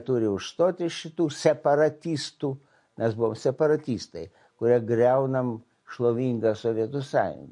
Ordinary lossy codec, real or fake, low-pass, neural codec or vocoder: MP3, 48 kbps; real; 10.8 kHz; none